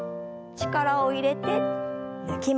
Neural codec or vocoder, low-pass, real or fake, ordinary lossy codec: none; none; real; none